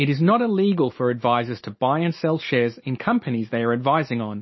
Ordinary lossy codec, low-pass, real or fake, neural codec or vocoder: MP3, 24 kbps; 7.2 kHz; real; none